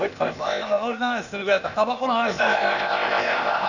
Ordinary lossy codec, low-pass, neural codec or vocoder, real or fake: none; 7.2 kHz; codec, 16 kHz, 0.8 kbps, ZipCodec; fake